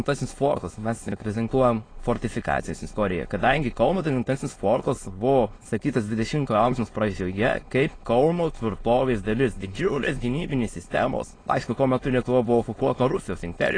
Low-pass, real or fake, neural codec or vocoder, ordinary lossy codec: 9.9 kHz; fake; autoencoder, 22.05 kHz, a latent of 192 numbers a frame, VITS, trained on many speakers; AAC, 32 kbps